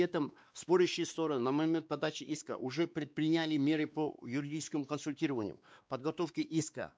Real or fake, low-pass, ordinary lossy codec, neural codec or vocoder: fake; none; none; codec, 16 kHz, 2 kbps, X-Codec, WavLM features, trained on Multilingual LibriSpeech